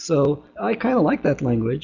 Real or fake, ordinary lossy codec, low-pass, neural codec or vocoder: real; Opus, 64 kbps; 7.2 kHz; none